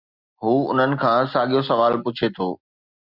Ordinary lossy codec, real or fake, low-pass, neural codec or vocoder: Opus, 64 kbps; real; 5.4 kHz; none